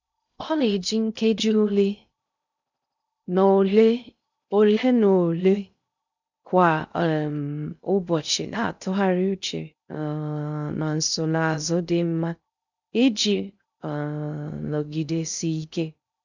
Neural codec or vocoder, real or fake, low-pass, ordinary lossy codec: codec, 16 kHz in and 24 kHz out, 0.6 kbps, FocalCodec, streaming, 2048 codes; fake; 7.2 kHz; none